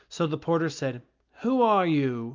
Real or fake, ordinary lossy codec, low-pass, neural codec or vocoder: real; Opus, 24 kbps; 7.2 kHz; none